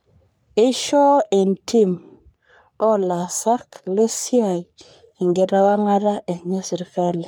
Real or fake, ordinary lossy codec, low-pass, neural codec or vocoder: fake; none; none; codec, 44.1 kHz, 3.4 kbps, Pupu-Codec